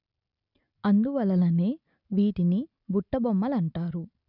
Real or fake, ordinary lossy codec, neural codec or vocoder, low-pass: real; none; none; 5.4 kHz